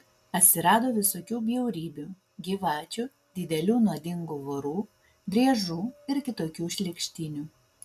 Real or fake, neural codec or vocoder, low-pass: real; none; 14.4 kHz